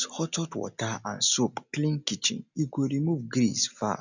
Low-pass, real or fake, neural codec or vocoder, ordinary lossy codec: 7.2 kHz; real; none; none